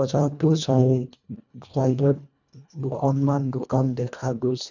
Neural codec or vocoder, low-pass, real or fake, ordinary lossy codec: codec, 24 kHz, 1.5 kbps, HILCodec; 7.2 kHz; fake; none